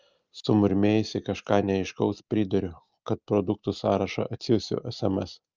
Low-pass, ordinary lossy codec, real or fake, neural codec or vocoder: 7.2 kHz; Opus, 24 kbps; real; none